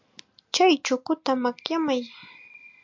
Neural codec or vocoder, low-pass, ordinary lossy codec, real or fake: none; 7.2 kHz; MP3, 64 kbps; real